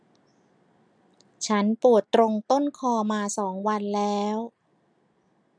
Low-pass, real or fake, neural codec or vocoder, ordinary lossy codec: 9.9 kHz; real; none; none